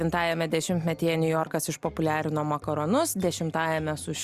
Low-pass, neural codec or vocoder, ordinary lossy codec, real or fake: 14.4 kHz; none; Opus, 64 kbps; real